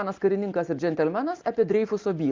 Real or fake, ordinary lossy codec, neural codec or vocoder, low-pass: real; Opus, 24 kbps; none; 7.2 kHz